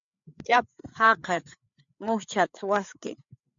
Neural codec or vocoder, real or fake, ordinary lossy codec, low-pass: codec, 16 kHz, 4 kbps, FreqCodec, larger model; fake; MP3, 64 kbps; 7.2 kHz